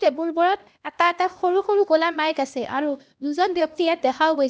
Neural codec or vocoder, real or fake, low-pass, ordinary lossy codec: codec, 16 kHz, 1 kbps, X-Codec, HuBERT features, trained on LibriSpeech; fake; none; none